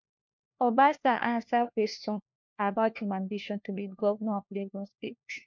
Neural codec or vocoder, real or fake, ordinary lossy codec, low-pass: codec, 16 kHz, 1 kbps, FunCodec, trained on LibriTTS, 50 frames a second; fake; MP3, 48 kbps; 7.2 kHz